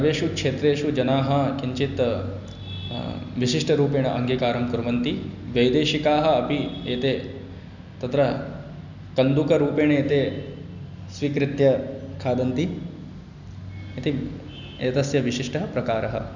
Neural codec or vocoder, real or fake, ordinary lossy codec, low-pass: none; real; none; 7.2 kHz